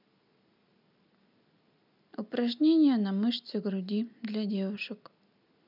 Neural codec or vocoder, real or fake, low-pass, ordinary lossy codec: none; real; 5.4 kHz; none